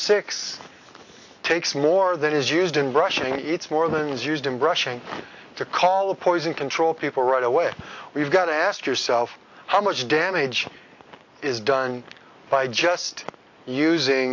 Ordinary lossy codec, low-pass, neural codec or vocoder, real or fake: AAC, 48 kbps; 7.2 kHz; none; real